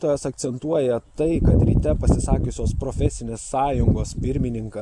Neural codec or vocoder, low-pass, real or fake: none; 10.8 kHz; real